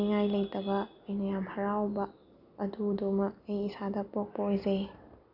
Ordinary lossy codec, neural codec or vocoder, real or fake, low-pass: none; none; real; 5.4 kHz